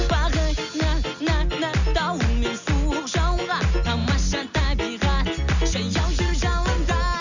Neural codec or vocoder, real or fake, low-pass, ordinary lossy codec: none; real; 7.2 kHz; none